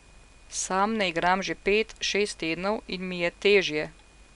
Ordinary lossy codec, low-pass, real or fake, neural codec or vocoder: none; 10.8 kHz; real; none